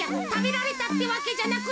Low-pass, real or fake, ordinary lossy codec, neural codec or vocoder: none; real; none; none